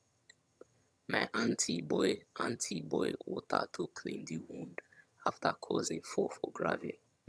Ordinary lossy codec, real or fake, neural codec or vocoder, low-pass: none; fake; vocoder, 22.05 kHz, 80 mel bands, HiFi-GAN; none